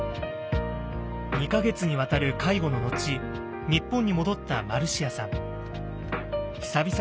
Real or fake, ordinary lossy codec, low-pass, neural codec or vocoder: real; none; none; none